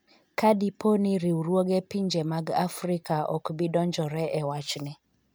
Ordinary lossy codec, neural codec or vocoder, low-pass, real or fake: none; none; none; real